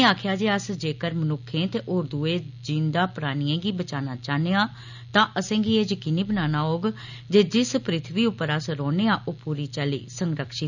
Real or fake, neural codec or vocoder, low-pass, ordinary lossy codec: real; none; 7.2 kHz; none